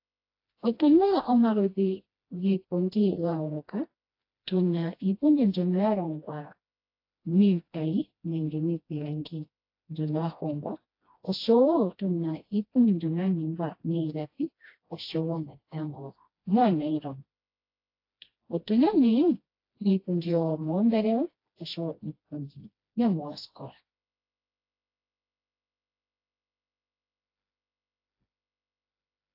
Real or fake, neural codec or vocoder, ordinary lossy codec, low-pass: fake; codec, 16 kHz, 1 kbps, FreqCodec, smaller model; AAC, 32 kbps; 5.4 kHz